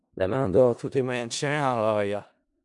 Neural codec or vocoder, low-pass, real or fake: codec, 16 kHz in and 24 kHz out, 0.4 kbps, LongCat-Audio-Codec, four codebook decoder; 10.8 kHz; fake